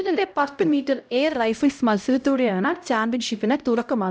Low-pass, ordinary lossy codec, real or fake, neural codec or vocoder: none; none; fake; codec, 16 kHz, 0.5 kbps, X-Codec, HuBERT features, trained on LibriSpeech